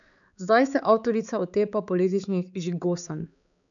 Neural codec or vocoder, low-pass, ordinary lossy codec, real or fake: codec, 16 kHz, 4 kbps, X-Codec, HuBERT features, trained on balanced general audio; 7.2 kHz; none; fake